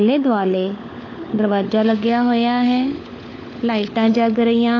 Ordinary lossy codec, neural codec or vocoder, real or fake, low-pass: AAC, 32 kbps; codec, 16 kHz, 16 kbps, FunCodec, trained on LibriTTS, 50 frames a second; fake; 7.2 kHz